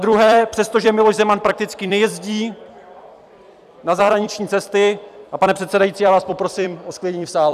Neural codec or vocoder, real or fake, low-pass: vocoder, 44.1 kHz, 128 mel bands every 256 samples, BigVGAN v2; fake; 14.4 kHz